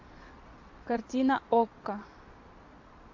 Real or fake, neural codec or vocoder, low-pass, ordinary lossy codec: fake; vocoder, 22.05 kHz, 80 mel bands, Vocos; 7.2 kHz; AAC, 48 kbps